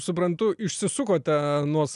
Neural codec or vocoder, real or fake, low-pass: none; real; 10.8 kHz